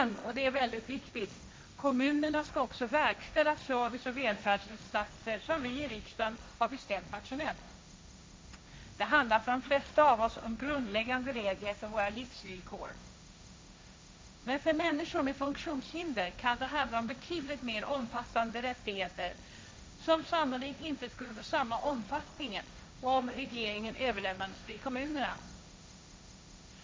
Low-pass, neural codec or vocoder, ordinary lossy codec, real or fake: none; codec, 16 kHz, 1.1 kbps, Voila-Tokenizer; none; fake